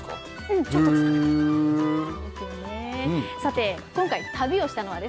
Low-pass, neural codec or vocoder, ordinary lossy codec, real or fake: none; none; none; real